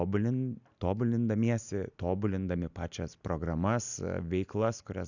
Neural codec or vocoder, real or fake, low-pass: none; real; 7.2 kHz